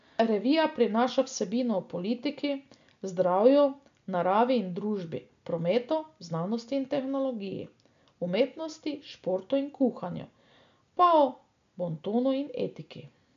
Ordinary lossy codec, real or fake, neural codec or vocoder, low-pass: MP3, 64 kbps; real; none; 7.2 kHz